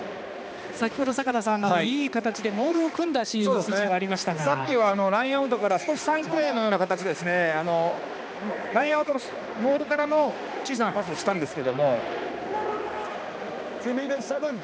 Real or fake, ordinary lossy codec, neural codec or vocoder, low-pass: fake; none; codec, 16 kHz, 2 kbps, X-Codec, HuBERT features, trained on balanced general audio; none